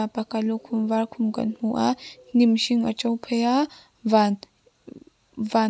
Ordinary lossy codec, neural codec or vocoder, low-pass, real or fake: none; none; none; real